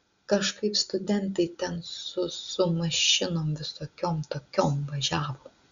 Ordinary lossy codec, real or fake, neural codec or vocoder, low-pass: Opus, 64 kbps; real; none; 7.2 kHz